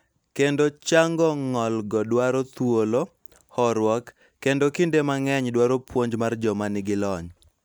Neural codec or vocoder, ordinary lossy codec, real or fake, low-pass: none; none; real; none